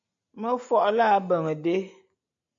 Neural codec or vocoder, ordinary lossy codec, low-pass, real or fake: none; MP3, 64 kbps; 7.2 kHz; real